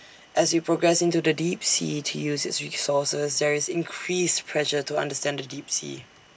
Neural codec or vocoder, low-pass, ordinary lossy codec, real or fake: none; none; none; real